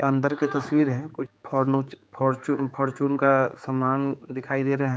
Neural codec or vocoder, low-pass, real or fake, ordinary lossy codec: codec, 16 kHz, 4 kbps, X-Codec, HuBERT features, trained on general audio; none; fake; none